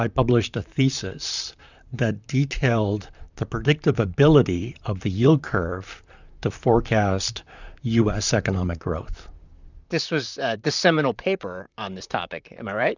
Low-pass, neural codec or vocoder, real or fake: 7.2 kHz; vocoder, 44.1 kHz, 128 mel bands, Pupu-Vocoder; fake